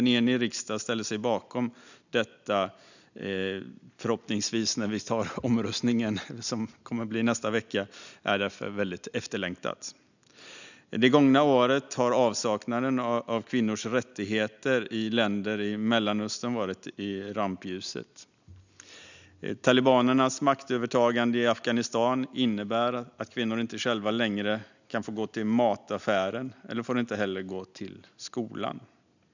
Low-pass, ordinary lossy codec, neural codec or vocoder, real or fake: 7.2 kHz; none; none; real